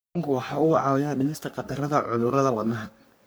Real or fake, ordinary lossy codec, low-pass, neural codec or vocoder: fake; none; none; codec, 44.1 kHz, 3.4 kbps, Pupu-Codec